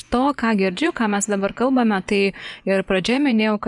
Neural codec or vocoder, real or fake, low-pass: vocoder, 24 kHz, 100 mel bands, Vocos; fake; 10.8 kHz